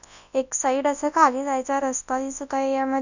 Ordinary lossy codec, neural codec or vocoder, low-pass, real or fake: none; codec, 24 kHz, 0.9 kbps, WavTokenizer, large speech release; 7.2 kHz; fake